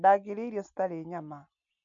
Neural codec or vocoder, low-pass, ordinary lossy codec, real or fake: none; 7.2 kHz; none; real